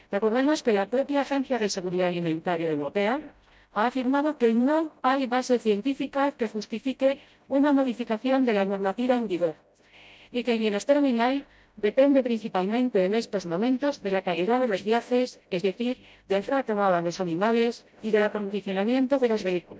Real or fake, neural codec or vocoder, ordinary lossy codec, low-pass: fake; codec, 16 kHz, 0.5 kbps, FreqCodec, smaller model; none; none